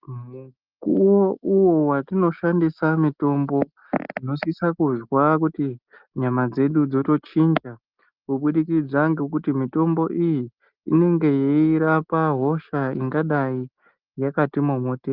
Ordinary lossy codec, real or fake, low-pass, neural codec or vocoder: Opus, 32 kbps; real; 5.4 kHz; none